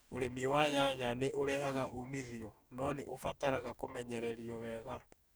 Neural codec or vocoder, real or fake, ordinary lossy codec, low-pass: codec, 44.1 kHz, 2.6 kbps, DAC; fake; none; none